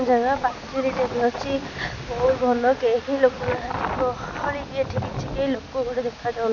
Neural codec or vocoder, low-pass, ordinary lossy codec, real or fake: none; 7.2 kHz; none; real